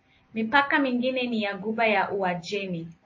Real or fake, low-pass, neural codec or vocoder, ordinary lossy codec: real; 7.2 kHz; none; MP3, 32 kbps